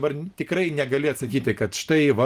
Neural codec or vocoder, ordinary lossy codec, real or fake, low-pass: none; Opus, 24 kbps; real; 14.4 kHz